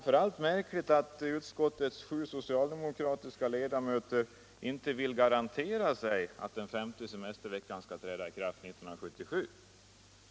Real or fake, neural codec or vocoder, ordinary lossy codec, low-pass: real; none; none; none